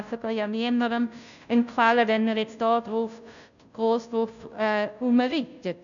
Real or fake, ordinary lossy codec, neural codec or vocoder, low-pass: fake; none; codec, 16 kHz, 0.5 kbps, FunCodec, trained on Chinese and English, 25 frames a second; 7.2 kHz